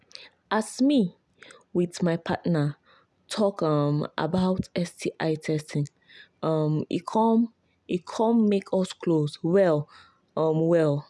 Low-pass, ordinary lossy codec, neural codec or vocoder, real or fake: none; none; none; real